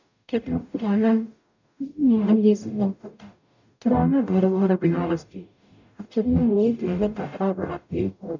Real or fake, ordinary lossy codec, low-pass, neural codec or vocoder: fake; none; 7.2 kHz; codec, 44.1 kHz, 0.9 kbps, DAC